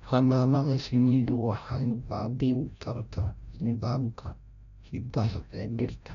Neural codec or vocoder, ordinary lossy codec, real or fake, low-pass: codec, 16 kHz, 0.5 kbps, FreqCodec, larger model; none; fake; 7.2 kHz